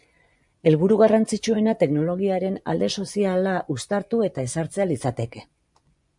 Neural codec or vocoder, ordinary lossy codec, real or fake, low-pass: vocoder, 24 kHz, 100 mel bands, Vocos; MP3, 96 kbps; fake; 10.8 kHz